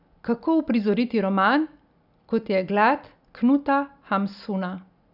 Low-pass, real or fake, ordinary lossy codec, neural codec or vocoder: 5.4 kHz; real; none; none